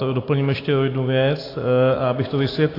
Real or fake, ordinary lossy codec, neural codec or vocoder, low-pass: real; AAC, 24 kbps; none; 5.4 kHz